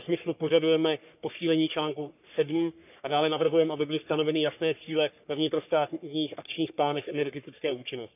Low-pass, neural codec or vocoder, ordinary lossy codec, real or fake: 3.6 kHz; codec, 44.1 kHz, 3.4 kbps, Pupu-Codec; none; fake